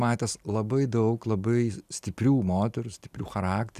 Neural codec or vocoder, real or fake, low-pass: none; real; 14.4 kHz